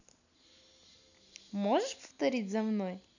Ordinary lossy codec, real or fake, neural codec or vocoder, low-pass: none; real; none; 7.2 kHz